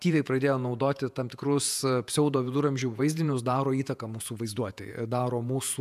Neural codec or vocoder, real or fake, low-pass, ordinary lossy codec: vocoder, 48 kHz, 128 mel bands, Vocos; fake; 14.4 kHz; AAC, 96 kbps